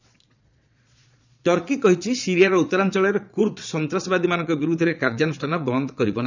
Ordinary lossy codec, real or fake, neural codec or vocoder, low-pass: none; fake; vocoder, 22.05 kHz, 80 mel bands, Vocos; 7.2 kHz